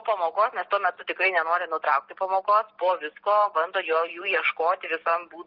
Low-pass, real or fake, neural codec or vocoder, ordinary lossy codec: 5.4 kHz; real; none; Opus, 16 kbps